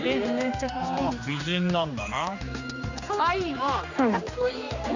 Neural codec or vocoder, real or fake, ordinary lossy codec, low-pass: codec, 16 kHz, 2 kbps, X-Codec, HuBERT features, trained on general audio; fake; none; 7.2 kHz